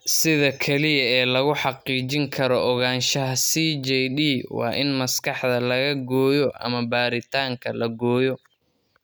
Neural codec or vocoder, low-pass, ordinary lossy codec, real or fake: none; none; none; real